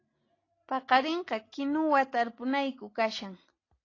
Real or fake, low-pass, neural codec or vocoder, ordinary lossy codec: real; 7.2 kHz; none; AAC, 32 kbps